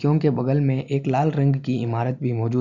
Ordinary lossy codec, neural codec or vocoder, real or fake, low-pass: none; none; real; 7.2 kHz